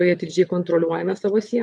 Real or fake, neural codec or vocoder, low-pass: fake; vocoder, 22.05 kHz, 80 mel bands, WaveNeXt; 9.9 kHz